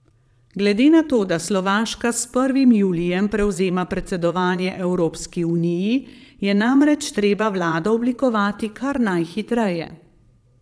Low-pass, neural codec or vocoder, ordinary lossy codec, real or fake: none; vocoder, 22.05 kHz, 80 mel bands, Vocos; none; fake